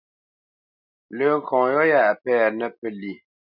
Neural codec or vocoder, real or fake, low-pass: none; real; 5.4 kHz